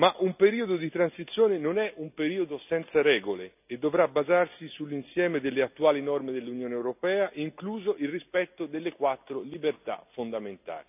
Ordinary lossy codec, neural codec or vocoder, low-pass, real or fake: none; none; 3.6 kHz; real